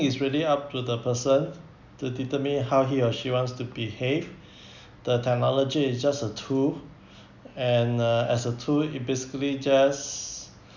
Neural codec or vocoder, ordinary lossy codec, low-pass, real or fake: none; none; 7.2 kHz; real